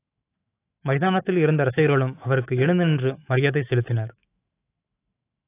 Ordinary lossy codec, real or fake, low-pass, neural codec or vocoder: AAC, 24 kbps; fake; 3.6 kHz; autoencoder, 48 kHz, 128 numbers a frame, DAC-VAE, trained on Japanese speech